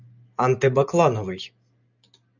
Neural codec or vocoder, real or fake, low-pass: none; real; 7.2 kHz